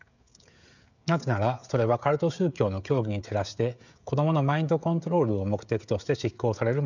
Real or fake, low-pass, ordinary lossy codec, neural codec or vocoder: fake; 7.2 kHz; none; codec, 16 kHz, 16 kbps, FreqCodec, smaller model